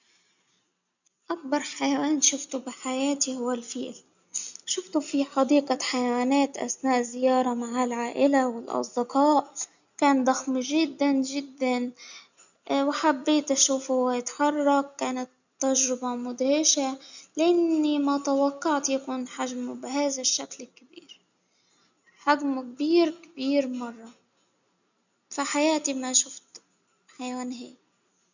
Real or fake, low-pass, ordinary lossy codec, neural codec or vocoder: real; 7.2 kHz; none; none